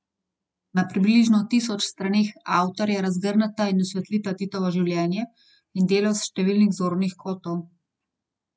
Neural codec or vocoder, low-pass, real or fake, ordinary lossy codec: none; none; real; none